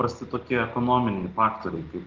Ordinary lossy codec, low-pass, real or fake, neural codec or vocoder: Opus, 16 kbps; 7.2 kHz; real; none